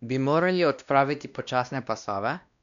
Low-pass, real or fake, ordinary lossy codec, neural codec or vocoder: 7.2 kHz; fake; none; codec, 16 kHz, 1 kbps, X-Codec, WavLM features, trained on Multilingual LibriSpeech